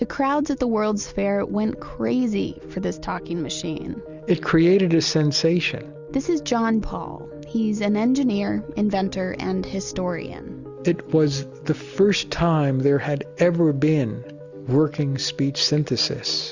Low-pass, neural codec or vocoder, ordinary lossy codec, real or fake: 7.2 kHz; none; Opus, 64 kbps; real